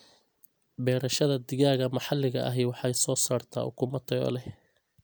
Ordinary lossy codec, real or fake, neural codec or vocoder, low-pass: none; real; none; none